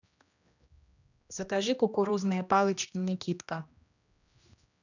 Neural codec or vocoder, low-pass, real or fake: codec, 16 kHz, 1 kbps, X-Codec, HuBERT features, trained on general audio; 7.2 kHz; fake